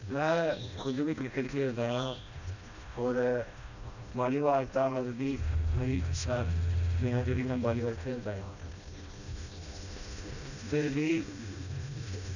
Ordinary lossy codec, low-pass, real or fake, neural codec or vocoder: none; 7.2 kHz; fake; codec, 16 kHz, 1 kbps, FreqCodec, smaller model